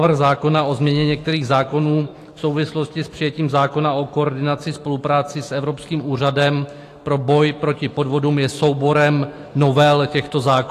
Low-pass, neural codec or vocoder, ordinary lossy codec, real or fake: 14.4 kHz; autoencoder, 48 kHz, 128 numbers a frame, DAC-VAE, trained on Japanese speech; AAC, 48 kbps; fake